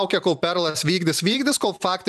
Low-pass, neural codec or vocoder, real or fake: 14.4 kHz; vocoder, 44.1 kHz, 128 mel bands every 512 samples, BigVGAN v2; fake